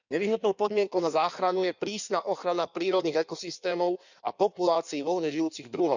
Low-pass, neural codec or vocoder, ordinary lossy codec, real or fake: 7.2 kHz; codec, 16 kHz in and 24 kHz out, 1.1 kbps, FireRedTTS-2 codec; none; fake